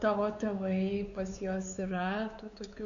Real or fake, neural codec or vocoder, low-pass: fake; codec, 16 kHz, 4 kbps, X-Codec, WavLM features, trained on Multilingual LibriSpeech; 7.2 kHz